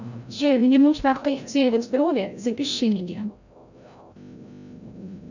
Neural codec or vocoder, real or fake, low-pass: codec, 16 kHz, 0.5 kbps, FreqCodec, larger model; fake; 7.2 kHz